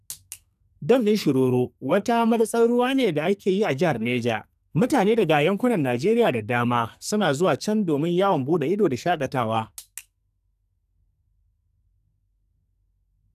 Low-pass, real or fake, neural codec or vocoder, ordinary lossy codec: 14.4 kHz; fake; codec, 44.1 kHz, 2.6 kbps, SNAC; none